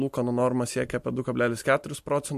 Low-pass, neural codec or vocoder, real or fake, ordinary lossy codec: 14.4 kHz; none; real; MP3, 64 kbps